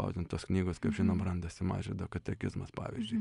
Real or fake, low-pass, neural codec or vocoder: real; 10.8 kHz; none